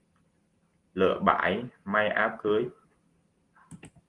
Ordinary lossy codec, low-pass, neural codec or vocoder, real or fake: Opus, 24 kbps; 10.8 kHz; none; real